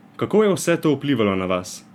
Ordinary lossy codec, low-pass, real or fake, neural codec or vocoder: none; 19.8 kHz; fake; vocoder, 44.1 kHz, 128 mel bands every 256 samples, BigVGAN v2